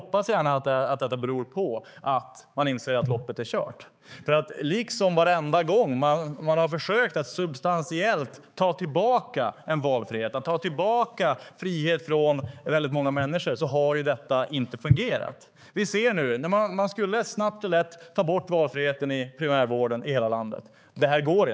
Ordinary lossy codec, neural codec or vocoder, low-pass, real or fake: none; codec, 16 kHz, 4 kbps, X-Codec, HuBERT features, trained on balanced general audio; none; fake